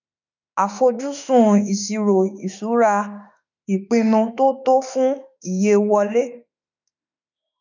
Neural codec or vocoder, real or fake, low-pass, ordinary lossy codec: autoencoder, 48 kHz, 32 numbers a frame, DAC-VAE, trained on Japanese speech; fake; 7.2 kHz; none